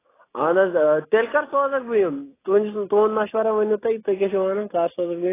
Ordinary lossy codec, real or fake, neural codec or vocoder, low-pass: AAC, 16 kbps; real; none; 3.6 kHz